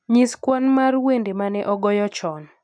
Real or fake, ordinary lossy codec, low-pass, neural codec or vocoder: real; none; 9.9 kHz; none